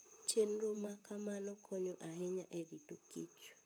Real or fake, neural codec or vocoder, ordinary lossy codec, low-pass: fake; vocoder, 44.1 kHz, 128 mel bands, Pupu-Vocoder; none; none